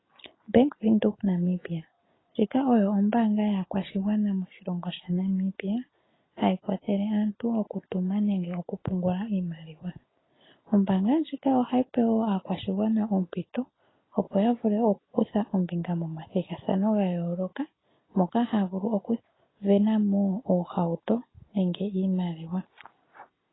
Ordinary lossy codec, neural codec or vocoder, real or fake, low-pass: AAC, 16 kbps; none; real; 7.2 kHz